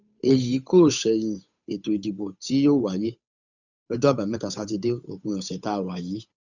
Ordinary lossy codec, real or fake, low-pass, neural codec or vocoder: none; fake; 7.2 kHz; codec, 16 kHz, 8 kbps, FunCodec, trained on Chinese and English, 25 frames a second